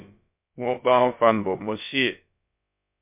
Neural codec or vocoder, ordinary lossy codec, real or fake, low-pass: codec, 16 kHz, about 1 kbps, DyCAST, with the encoder's durations; MP3, 32 kbps; fake; 3.6 kHz